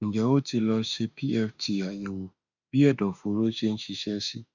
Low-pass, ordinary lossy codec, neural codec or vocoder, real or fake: 7.2 kHz; none; autoencoder, 48 kHz, 32 numbers a frame, DAC-VAE, trained on Japanese speech; fake